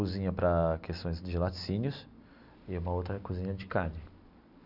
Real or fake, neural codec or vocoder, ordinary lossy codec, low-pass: real; none; none; 5.4 kHz